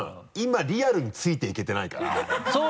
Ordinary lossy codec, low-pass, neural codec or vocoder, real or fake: none; none; none; real